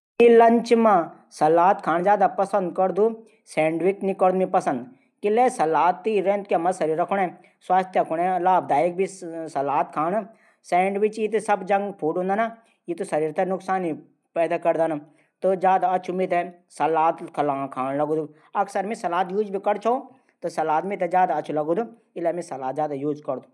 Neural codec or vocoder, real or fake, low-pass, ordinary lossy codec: none; real; none; none